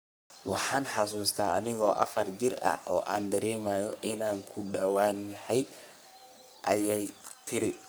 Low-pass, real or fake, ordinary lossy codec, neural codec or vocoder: none; fake; none; codec, 44.1 kHz, 3.4 kbps, Pupu-Codec